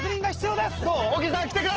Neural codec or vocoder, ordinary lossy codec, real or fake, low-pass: none; Opus, 16 kbps; real; 7.2 kHz